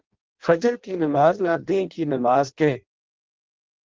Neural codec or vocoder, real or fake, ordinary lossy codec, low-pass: codec, 16 kHz in and 24 kHz out, 0.6 kbps, FireRedTTS-2 codec; fake; Opus, 16 kbps; 7.2 kHz